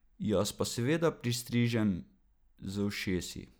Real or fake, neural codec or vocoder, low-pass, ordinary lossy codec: real; none; none; none